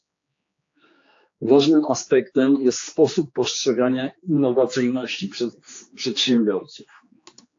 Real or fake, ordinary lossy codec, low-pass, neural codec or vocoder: fake; AAC, 48 kbps; 7.2 kHz; codec, 16 kHz, 2 kbps, X-Codec, HuBERT features, trained on general audio